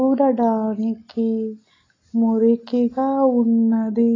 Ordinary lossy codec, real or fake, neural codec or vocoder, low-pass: AAC, 32 kbps; real; none; 7.2 kHz